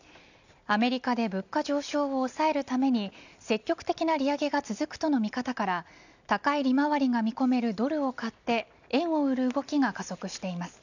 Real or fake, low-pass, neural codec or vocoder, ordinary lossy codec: real; 7.2 kHz; none; none